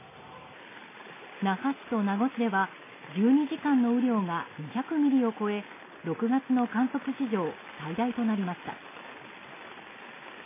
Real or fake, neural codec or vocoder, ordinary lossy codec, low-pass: real; none; MP3, 16 kbps; 3.6 kHz